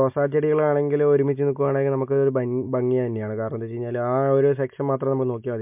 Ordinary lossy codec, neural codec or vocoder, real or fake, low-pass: MP3, 32 kbps; none; real; 3.6 kHz